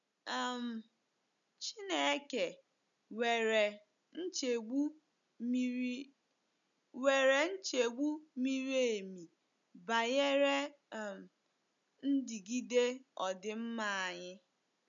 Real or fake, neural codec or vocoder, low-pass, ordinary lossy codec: real; none; 7.2 kHz; none